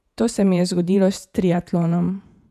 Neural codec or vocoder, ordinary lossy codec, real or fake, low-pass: vocoder, 48 kHz, 128 mel bands, Vocos; none; fake; 14.4 kHz